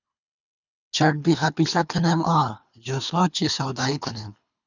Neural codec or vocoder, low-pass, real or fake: codec, 24 kHz, 3 kbps, HILCodec; 7.2 kHz; fake